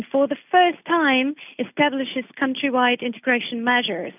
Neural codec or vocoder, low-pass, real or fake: none; 3.6 kHz; real